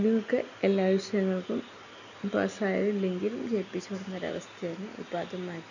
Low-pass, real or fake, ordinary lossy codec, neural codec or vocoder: 7.2 kHz; real; none; none